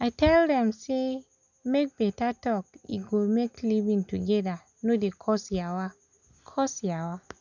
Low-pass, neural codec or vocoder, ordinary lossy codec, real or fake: 7.2 kHz; none; none; real